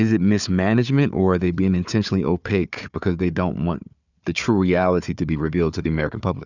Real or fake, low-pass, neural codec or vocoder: fake; 7.2 kHz; codec, 16 kHz, 4 kbps, FunCodec, trained on Chinese and English, 50 frames a second